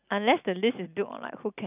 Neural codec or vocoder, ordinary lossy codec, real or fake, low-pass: none; none; real; 3.6 kHz